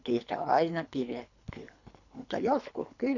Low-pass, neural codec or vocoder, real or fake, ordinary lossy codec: 7.2 kHz; codec, 24 kHz, 3 kbps, HILCodec; fake; none